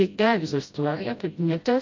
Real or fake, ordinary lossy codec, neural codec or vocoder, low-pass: fake; MP3, 48 kbps; codec, 16 kHz, 0.5 kbps, FreqCodec, smaller model; 7.2 kHz